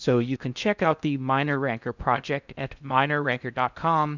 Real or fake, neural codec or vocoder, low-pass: fake; codec, 16 kHz in and 24 kHz out, 0.6 kbps, FocalCodec, streaming, 2048 codes; 7.2 kHz